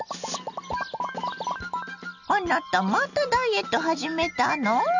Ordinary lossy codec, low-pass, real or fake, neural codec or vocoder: none; 7.2 kHz; real; none